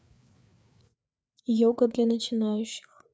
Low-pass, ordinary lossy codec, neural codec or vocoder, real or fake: none; none; codec, 16 kHz, 4 kbps, FreqCodec, larger model; fake